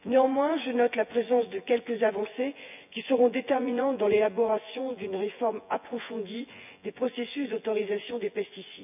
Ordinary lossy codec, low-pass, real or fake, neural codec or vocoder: none; 3.6 kHz; fake; vocoder, 24 kHz, 100 mel bands, Vocos